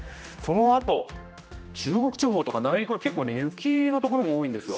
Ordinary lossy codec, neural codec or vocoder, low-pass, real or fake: none; codec, 16 kHz, 1 kbps, X-Codec, HuBERT features, trained on general audio; none; fake